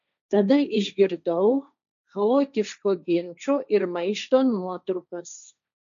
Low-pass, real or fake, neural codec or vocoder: 7.2 kHz; fake; codec, 16 kHz, 1.1 kbps, Voila-Tokenizer